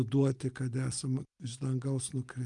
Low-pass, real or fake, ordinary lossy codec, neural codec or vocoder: 10.8 kHz; real; Opus, 32 kbps; none